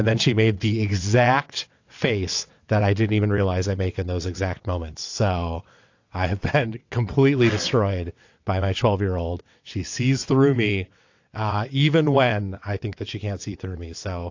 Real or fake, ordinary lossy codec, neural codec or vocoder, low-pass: fake; AAC, 48 kbps; vocoder, 22.05 kHz, 80 mel bands, WaveNeXt; 7.2 kHz